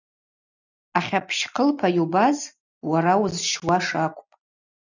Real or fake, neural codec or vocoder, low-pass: real; none; 7.2 kHz